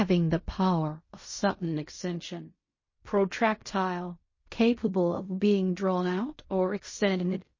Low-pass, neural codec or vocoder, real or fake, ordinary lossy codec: 7.2 kHz; codec, 16 kHz in and 24 kHz out, 0.4 kbps, LongCat-Audio-Codec, fine tuned four codebook decoder; fake; MP3, 32 kbps